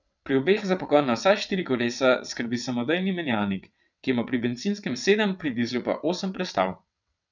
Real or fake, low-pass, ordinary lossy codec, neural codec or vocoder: fake; 7.2 kHz; none; vocoder, 22.05 kHz, 80 mel bands, WaveNeXt